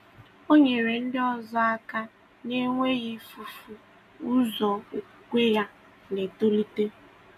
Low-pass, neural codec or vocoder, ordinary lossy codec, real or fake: 14.4 kHz; none; none; real